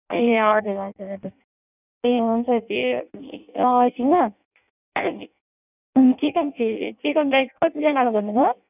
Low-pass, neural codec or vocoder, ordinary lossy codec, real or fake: 3.6 kHz; codec, 16 kHz in and 24 kHz out, 0.6 kbps, FireRedTTS-2 codec; none; fake